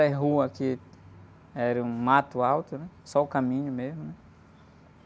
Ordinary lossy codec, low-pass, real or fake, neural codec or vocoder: none; none; real; none